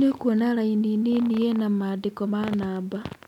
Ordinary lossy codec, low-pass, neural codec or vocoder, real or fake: none; 19.8 kHz; none; real